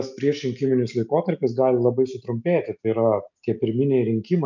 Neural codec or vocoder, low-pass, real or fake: none; 7.2 kHz; real